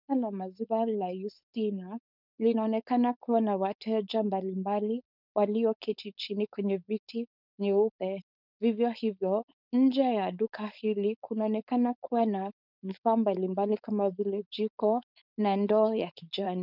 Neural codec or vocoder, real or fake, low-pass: codec, 16 kHz, 4.8 kbps, FACodec; fake; 5.4 kHz